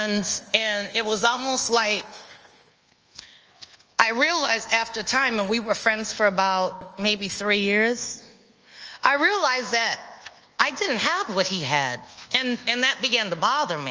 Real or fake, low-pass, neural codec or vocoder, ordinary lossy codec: fake; 7.2 kHz; codec, 24 kHz, 1.2 kbps, DualCodec; Opus, 24 kbps